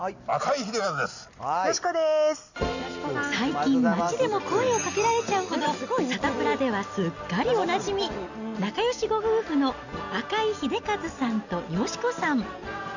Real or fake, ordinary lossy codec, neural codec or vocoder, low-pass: real; none; none; 7.2 kHz